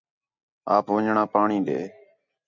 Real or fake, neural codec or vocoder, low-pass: real; none; 7.2 kHz